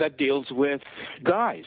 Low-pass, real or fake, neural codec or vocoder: 5.4 kHz; real; none